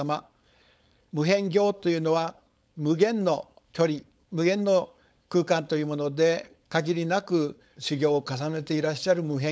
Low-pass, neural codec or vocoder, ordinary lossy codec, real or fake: none; codec, 16 kHz, 4.8 kbps, FACodec; none; fake